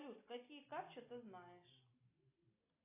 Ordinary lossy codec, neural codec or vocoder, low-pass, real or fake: AAC, 24 kbps; none; 3.6 kHz; real